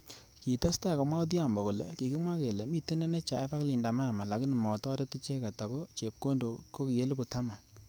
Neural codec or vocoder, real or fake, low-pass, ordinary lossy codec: codec, 44.1 kHz, 7.8 kbps, DAC; fake; none; none